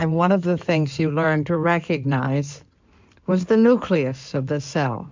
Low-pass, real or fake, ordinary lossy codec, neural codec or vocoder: 7.2 kHz; fake; MP3, 64 kbps; codec, 16 kHz in and 24 kHz out, 2.2 kbps, FireRedTTS-2 codec